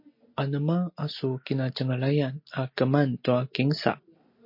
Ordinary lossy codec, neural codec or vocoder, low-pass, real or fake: MP3, 32 kbps; none; 5.4 kHz; real